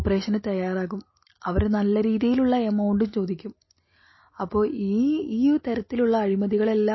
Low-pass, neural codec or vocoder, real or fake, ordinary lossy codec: 7.2 kHz; none; real; MP3, 24 kbps